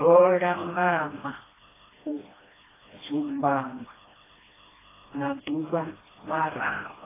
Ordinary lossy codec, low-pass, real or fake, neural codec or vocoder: AAC, 16 kbps; 3.6 kHz; fake; codec, 16 kHz, 1 kbps, FreqCodec, smaller model